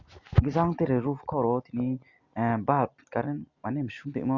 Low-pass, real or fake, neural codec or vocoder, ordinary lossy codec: 7.2 kHz; real; none; Opus, 64 kbps